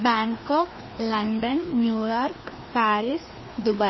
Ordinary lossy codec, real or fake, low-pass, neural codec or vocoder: MP3, 24 kbps; fake; 7.2 kHz; codec, 16 kHz, 2 kbps, FreqCodec, larger model